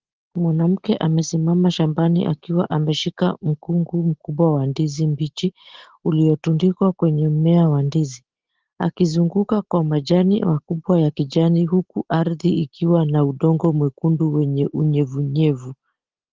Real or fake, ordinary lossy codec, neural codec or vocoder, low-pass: real; Opus, 16 kbps; none; 7.2 kHz